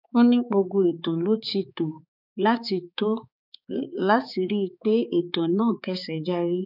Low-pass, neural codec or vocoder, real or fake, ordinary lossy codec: 5.4 kHz; codec, 16 kHz, 4 kbps, X-Codec, HuBERT features, trained on balanced general audio; fake; none